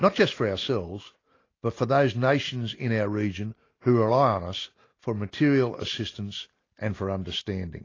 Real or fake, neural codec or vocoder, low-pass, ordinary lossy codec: real; none; 7.2 kHz; AAC, 32 kbps